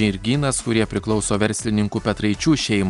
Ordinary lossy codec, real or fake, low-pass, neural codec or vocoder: Opus, 64 kbps; real; 10.8 kHz; none